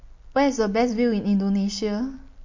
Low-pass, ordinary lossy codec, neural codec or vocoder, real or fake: 7.2 kHz; MP3, 48 kbps; none; real